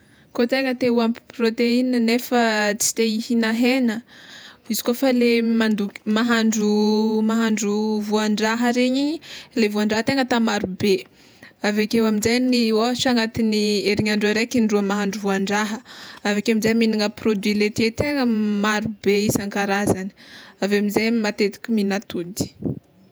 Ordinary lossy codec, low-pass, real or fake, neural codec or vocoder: none; none; fake; vocoder, 48 kHz, 128 mel bands, Vocos